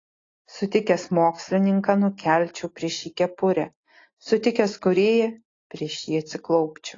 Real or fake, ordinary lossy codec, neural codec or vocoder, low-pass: real; AAC, 32 kbps; none; 7.2 kHz